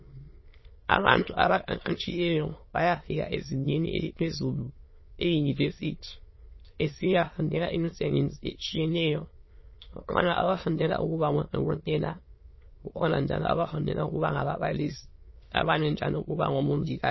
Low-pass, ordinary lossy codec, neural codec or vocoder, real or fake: 7.2 kHz; MP3, 24 kbps; autoencoder, 22.05 kHz, a latent of 192 numbers a frame, VITS, trained on many speakers; fake